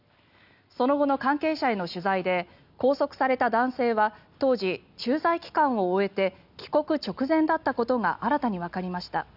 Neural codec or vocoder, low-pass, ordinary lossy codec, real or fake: none; 5.4 kHz; none; real